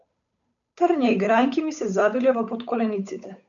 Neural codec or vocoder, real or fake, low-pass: codec, 16 kHz, 8 kbps, FunCodec, trained on Chinese and English, 25 frames a second; fake; 7.2 kHz